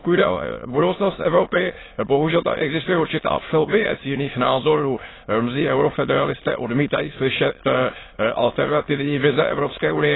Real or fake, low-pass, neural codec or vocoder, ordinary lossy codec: fake; 7.2 kHz; autoencoder, 22.05 kHz, a latent of 192 numbers a frame, VITS, trained on many speakers; AAC, 16 kbps